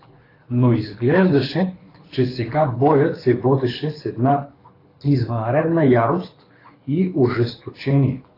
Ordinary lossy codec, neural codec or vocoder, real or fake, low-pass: AAC, 24 kbps; codec, 24 kHz, 6 kbps, HILCodec; fake; 5.4 kHz